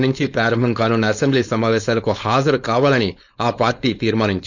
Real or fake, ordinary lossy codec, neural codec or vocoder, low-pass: fake; none; codec, 16 kHz, 4.8 kbps, FACodec; 7.2 kHz